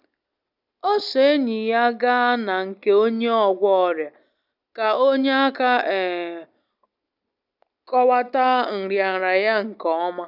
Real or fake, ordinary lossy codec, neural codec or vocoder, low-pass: real; none; none; 5.4 kHz